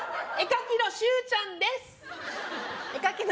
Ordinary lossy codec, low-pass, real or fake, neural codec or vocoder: none; none; real; none